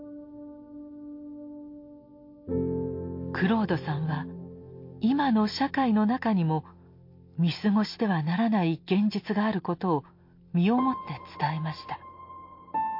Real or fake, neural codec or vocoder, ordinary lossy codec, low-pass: real; none; MP3, 32 kbps; 5.4 kHz